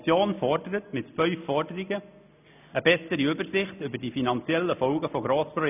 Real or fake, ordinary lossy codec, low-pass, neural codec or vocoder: real; none; 3.6 kHz; none